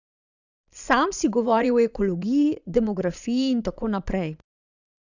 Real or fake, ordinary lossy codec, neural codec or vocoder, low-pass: fake; none; vocoder, 22.05 kHz, 80 mel bands, Vocos; 7.2 kHz